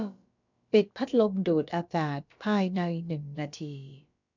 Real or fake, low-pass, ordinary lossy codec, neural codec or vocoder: fake; 7.2 kHz; none; codec, 16 kHz, about 1 kbps, DyCAST, with the encoder's durations